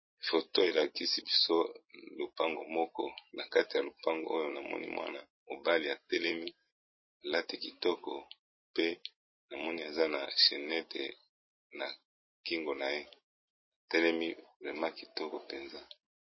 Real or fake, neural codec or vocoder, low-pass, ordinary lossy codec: real; none; 7.2 kHz; MP3, 24 kbps